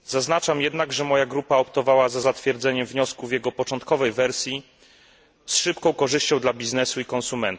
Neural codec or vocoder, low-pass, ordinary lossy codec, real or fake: none; none; none; real